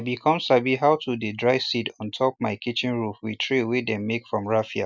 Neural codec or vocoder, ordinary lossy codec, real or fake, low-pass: none; none; real; none